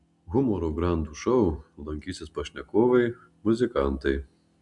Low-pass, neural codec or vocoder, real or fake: 10.8 kHz; none; real